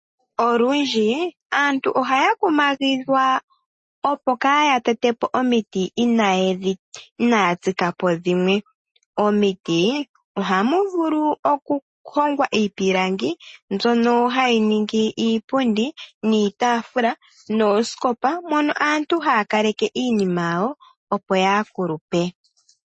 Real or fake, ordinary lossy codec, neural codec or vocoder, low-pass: real; MP3, 32 kbps; none; 10.8 kHz